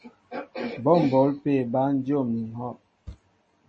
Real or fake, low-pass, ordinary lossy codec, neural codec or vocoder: real; 9.9 kHz; MP3, 32 kbps; none